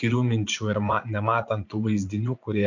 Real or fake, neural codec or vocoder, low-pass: fake; vocoder, 44.1 kHz, 80 mel bands, Vocos; 7.2 kHz